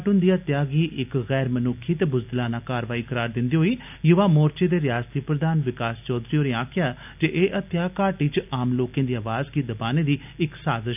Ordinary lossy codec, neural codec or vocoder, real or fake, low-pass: none; none; real; 3.6 kHz